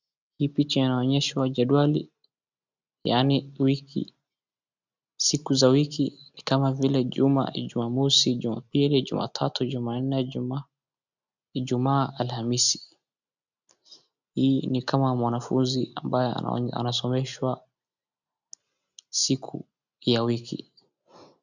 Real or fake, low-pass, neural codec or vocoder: real; 7.2 kHz; none